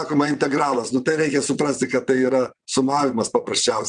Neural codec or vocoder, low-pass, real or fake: vocoder, 22.05 kHz, 80 mel bands, WaveNeXt; 9.9 kHz; fake